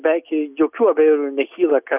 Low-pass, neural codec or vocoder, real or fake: 3.6 kHz; none; real